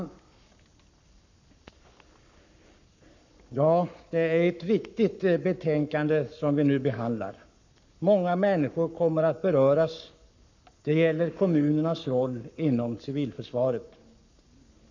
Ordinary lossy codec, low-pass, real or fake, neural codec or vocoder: none; 7.2 kHz; fake; codec, 44.1 kHz, 7.8 kbps, Pupu-Codec